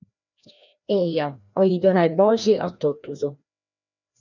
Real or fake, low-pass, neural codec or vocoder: fake; 7.2 kHz; codec, 16 kHz, 1 kbps, FreqCodec, larger model